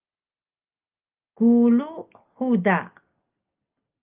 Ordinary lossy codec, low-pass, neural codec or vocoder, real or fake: Opus, 32 kbps; 3.6 kHz; none; real